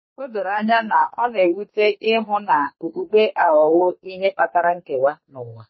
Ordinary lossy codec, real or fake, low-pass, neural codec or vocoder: MP3, 24 kbps; fake; 7.2 kHz; codec, 32 kHz, 1.9 kbps, SNAC